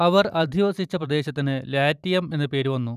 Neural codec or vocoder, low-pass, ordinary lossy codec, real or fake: vocoder, 44.1 kHz, 128 mel bands every 512 samples, BigVGAN v2; 14.4 kHz; none; fake